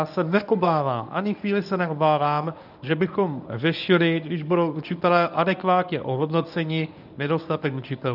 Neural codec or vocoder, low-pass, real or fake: codec, 24 kHz, 0.9 kbps, WavTokenizer, medium speech release version 1; 5.4 kHz; fake